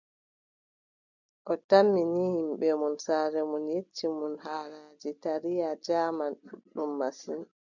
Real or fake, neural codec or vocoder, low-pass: real; none; 7.2 kHz